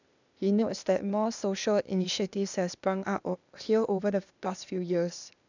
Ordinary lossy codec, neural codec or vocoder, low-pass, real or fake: none; codec, 16 kHz, 0.8 kbps, ZipCodec; 7.2 kHz; fake